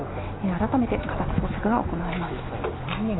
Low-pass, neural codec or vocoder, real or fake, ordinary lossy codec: 7.2 kHz; none; real; AAC, 16 kbps